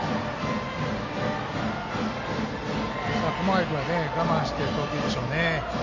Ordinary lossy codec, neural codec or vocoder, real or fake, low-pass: none; none; real; 7.2 kHz